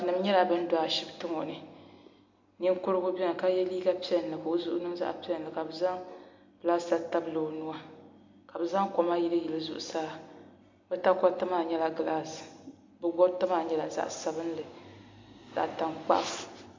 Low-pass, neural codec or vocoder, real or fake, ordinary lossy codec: 7.2 kHz; none; real; MP3, 48 kbps